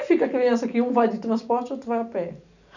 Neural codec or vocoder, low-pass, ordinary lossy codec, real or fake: none; 7.2 kHz; none; real